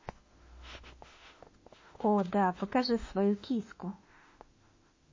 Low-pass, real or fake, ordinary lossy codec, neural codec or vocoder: 7.2 kHz; fake; MP3, 32 kbps; autoencoder, 48 kHz, 32 numbers a frame, DAC-VAE, trained on Japanese speech